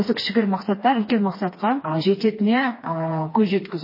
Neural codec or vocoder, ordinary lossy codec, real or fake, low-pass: codec, 16 kHz, 2 kbps, FreqCodec, smaller model; MP3, 24 kbps; fake; 5.4 kHz